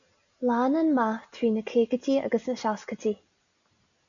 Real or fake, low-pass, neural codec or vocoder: real; 7.2 kHz; none